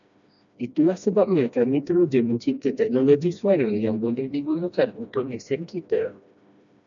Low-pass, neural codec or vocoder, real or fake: 7.2 kHz; codec, 16 kHz, 1 kbps, FreqCodec, smaller model; fake